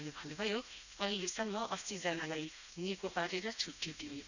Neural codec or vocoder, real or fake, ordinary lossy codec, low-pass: codec, 16 kHz, 1 kbps, FreqCodec, smaller model; fake; none; 7.2 kHz